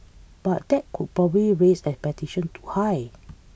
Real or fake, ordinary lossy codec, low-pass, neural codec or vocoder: real; none; none; none